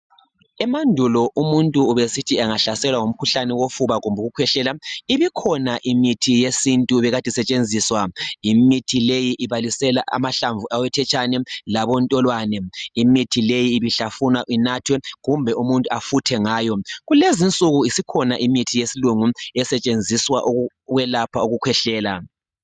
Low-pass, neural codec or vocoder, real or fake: 9.9 kHz; none; real